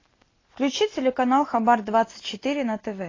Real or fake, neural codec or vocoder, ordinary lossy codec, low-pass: real; none; AAC, 32 kbps; 7.2 kHz